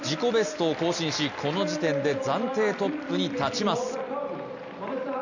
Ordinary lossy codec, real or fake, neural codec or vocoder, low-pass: none; real; none; 7.2 kHz